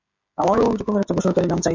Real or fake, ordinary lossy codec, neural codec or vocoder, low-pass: fake; MP3, 48 kbps; codec, 16 kHz, 16 kbps, FreqCodec, smaller model; 7.2 kHz